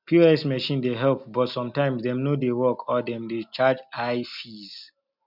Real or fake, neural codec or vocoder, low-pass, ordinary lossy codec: real; none; 5.4 kHz; none